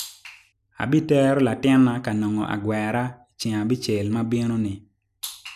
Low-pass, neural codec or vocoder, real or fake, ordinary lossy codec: 14.4 kHz; none; real; none